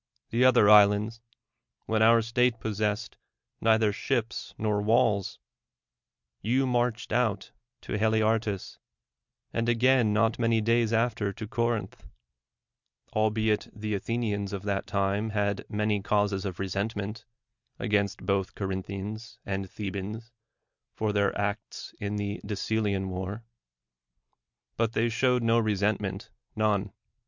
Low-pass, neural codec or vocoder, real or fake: 7.2 kHz; none; real